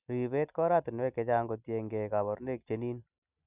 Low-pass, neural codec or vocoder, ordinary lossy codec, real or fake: 3.6 kHz; none; none; real